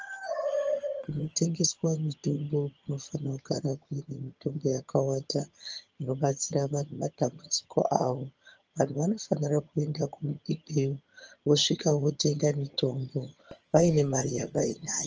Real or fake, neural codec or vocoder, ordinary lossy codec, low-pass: fake; vocoder, 22.05 kHz, 80 mel bands, HiFi-GAN; Opus, 24 kbps; 7.2 kHz